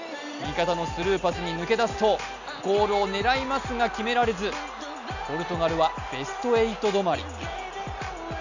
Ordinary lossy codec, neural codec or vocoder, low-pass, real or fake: none; none; 7.2 kHz; real